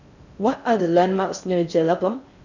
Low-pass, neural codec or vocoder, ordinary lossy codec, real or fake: 7.2 kHz; codec, 16 kHz in and 24 kHz out, 0.6 kbps, FocalCodec, streaming, 4096 codes; none; fake